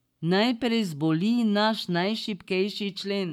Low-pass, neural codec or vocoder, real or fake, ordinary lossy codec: 19.8 kHz; codec, 44.1 kHz, 7.8 kbps, Pupu-Codec; fake; none